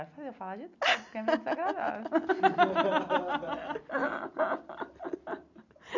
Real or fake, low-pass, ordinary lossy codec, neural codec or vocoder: real; 7.2 kHz; none; none